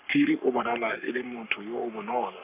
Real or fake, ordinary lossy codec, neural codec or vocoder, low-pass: fake; none; codec, 44.1 kHz, 3.4 kbps, Pupu-Codec; 3.6 kHz